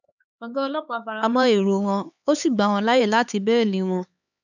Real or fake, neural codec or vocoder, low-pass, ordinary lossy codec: fake; codec, 16 kHz, 4 kbps, X-Codec, HuBERT features, trained on LibriSpeech; 7.2 kHz; none